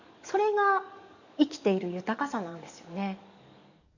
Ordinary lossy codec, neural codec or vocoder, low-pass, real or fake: none; codec, 44.1 kHz, 7.8 kbps, DAC; 7.2 kHz; fake